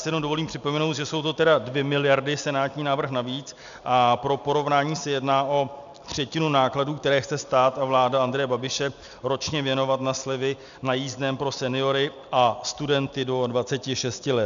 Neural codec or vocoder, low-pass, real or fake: none; 7.2 kHz; real